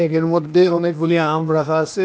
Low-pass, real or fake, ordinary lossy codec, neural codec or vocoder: none; fake; none; codec, 16 kHz, 0.8 kbps, ZipCodec